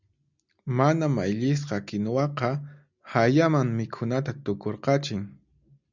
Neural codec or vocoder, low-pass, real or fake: none; 7.2 kHz; real